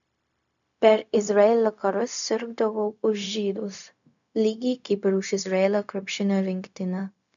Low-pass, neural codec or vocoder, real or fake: 7.2 kHz; codec, 16 kHz, 0.4 kbps, LongCat-Audio-Codec; fake